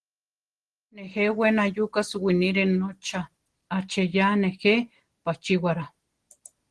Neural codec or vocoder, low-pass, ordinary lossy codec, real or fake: none; 10.8 kHz; Opus, 16 kbps; real